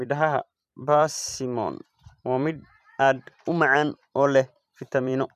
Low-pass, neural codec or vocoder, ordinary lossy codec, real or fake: 14.4 kHz; vocoder, 44.1 kHz, 128 mel bands every 256 samples, BigVGAN v2; none; fake